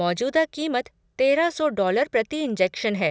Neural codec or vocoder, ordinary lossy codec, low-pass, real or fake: none; none; none; real